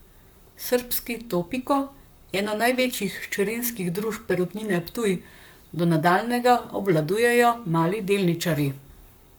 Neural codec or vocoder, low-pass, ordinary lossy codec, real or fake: codec, 44.1 kHz, 7.8 kbps, Pupu-Codec; none; none; fake